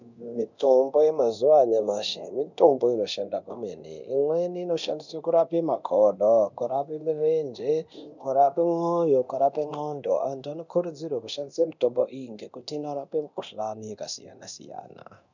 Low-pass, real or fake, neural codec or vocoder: 7.2 kHz; fake; codec, 24 kHz, 0.9 kbps, DualCodec